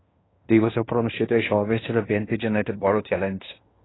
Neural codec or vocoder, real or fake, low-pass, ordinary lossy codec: codec, 16 kHz in and 24 kHz out, 0.9 kbps, LongCat-Audio-Codec, fine tuned four codebook decoder; fake; 7.2 kHz; AAC, 16 kbps